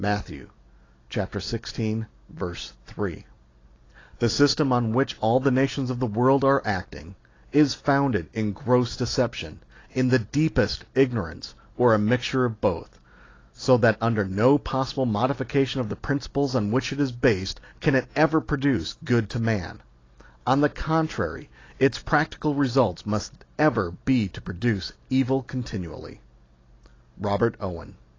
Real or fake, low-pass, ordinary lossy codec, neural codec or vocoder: real; 7.2 kHz; AAC, 32 kbps; none